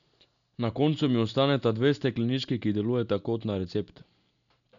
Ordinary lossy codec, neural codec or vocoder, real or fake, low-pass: none; none; real; 7.2 kHz